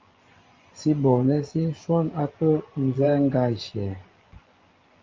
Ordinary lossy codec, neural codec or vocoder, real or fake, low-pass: Opus, 32 kbps; vocoder, 24 kHz, 100 mel bands, Vocos; fake; 7.2 kHz